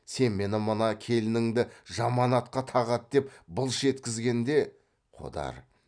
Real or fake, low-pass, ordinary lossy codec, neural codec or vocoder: real; 9.9 kHz; none; none